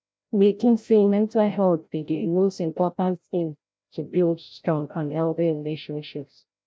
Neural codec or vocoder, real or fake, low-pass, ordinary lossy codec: codec, 16 kHz, 0.5 kbps, FreqCodec, larger model; fake; none; none